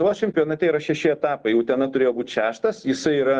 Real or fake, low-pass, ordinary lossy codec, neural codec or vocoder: real; 9.9 kHz; Opus, 24 kbps; none